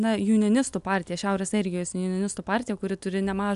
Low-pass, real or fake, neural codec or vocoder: 10.8 kHz; real; none